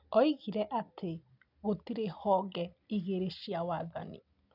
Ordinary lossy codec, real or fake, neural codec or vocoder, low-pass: none; real; none; 5.4 kHz